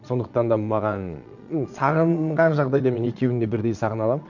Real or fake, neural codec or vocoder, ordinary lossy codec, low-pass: fake; vocoder, 22.05 kHz, 80 mel bands, Vocos; none; 7.2 kHz